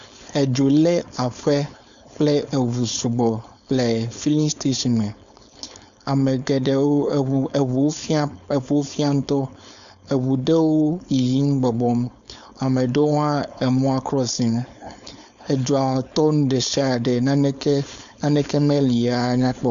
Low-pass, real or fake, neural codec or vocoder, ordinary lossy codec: 7.2 kHz; fake; codec, 16 kHz, 4.8 kbps, FACodec; MP3, 96 kbps